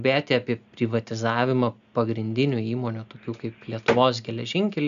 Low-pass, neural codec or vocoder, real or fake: 7.2 kHz; none; real